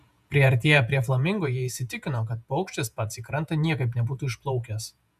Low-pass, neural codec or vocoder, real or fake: 14.4 kHz; vocoder, 48 kHz, 128 mel bands, Vocos; fake